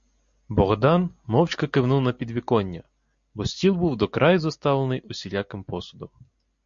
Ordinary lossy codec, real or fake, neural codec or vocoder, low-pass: MP3, 48 kbps; real; none; 7.2 kHz